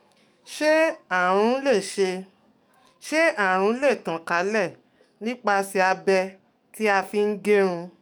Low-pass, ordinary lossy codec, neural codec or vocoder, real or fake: 19.8 kHz; none; codec, 44.1 kHz, 7.8 kbps, DAC; fake